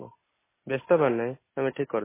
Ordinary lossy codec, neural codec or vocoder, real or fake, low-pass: MP3, 16 kbps; none; real; 3.6 kHz